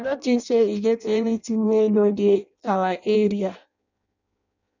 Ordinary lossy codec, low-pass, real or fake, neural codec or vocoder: none; 7.2 kHz; fake; codec, 16 kHz in and 24 kHz out, 0.6 kbps, FireRedTTS-2 codec